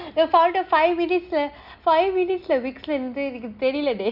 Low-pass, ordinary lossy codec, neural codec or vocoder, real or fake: 5.4 kHz; none; none; real